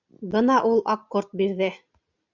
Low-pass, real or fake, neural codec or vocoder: 7.2 kHz; real; none